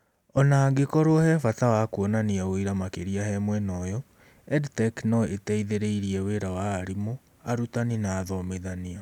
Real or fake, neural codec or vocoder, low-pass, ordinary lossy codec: real; none; 19.8 kHz; none